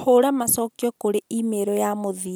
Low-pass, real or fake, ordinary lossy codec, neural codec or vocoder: none; real; none; none